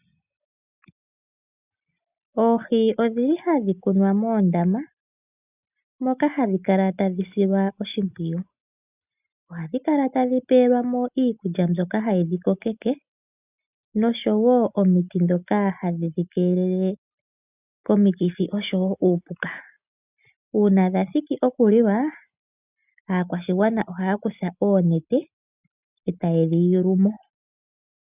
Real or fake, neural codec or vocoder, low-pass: real; none; 3.6 kHz